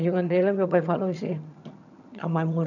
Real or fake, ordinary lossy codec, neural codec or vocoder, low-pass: fake; none; vocoder, 22.05 kHz, 80 mel bands, HiFi-GAN; 7.2 kHz